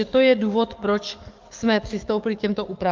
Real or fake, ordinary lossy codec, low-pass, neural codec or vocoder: fake; Opus, 32 kbps; 7.2 kHz; codec, 44.1 kHz, 7.8 kbps, DAC